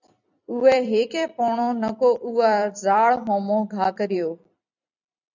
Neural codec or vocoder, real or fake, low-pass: vocoder, 44.1 kHz, 128 mel bands every 256 samples, BigVGAN v2; fake; 7.2 kHz